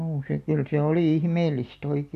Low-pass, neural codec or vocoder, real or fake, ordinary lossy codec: 14.4 kHz; none; real; AAC, 96 kbps